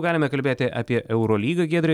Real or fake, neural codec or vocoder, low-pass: real; none; 19.8 kHz